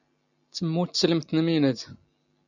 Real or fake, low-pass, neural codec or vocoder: real; 7.2 kHz; none